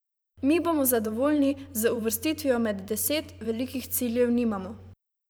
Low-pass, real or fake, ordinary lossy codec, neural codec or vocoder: none; fake; none; vocoder, 44.1 kHz, 128 mel bands every 512 samples, BigVGAN v2